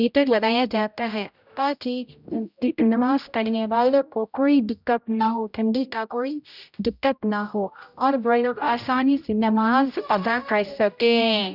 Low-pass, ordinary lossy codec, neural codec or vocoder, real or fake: 5.4 kHz; none; codec, 16 kHz, 0.5 kbps, X-Codec, HuBERT features, trained on general audio; fake